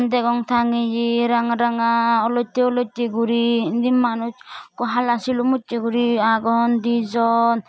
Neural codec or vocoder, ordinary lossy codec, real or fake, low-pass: none; none; real; none